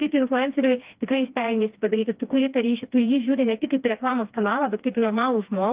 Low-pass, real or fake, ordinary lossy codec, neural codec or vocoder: 3.6 kHz; fake; Opus, 32 kbps; codec, 16 kHz, 2 kbps, FreqCodec, smaller model